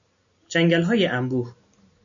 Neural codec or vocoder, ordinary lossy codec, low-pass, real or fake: none; MP3, 96 kbps; 7.2 kHz; real